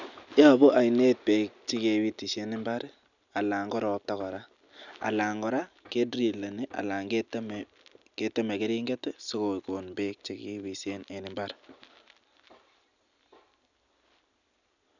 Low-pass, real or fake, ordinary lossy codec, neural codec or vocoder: 7.2 kHz; real; none; none